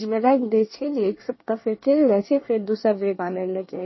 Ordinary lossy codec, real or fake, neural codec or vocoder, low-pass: MP3, 24 kbps; fake; codec, 24 kHz, 1 kbps, SNAC; 7.2 kHz